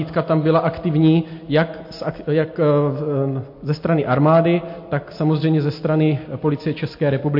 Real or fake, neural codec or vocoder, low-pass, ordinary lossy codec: real; none; 5.4 kHz; MP3, 32 kbps